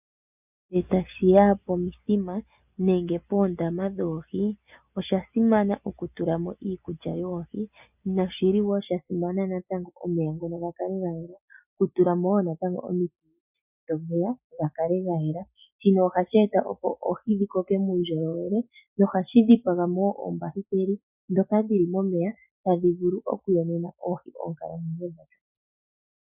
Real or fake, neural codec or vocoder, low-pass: real; none; 3.6 kHz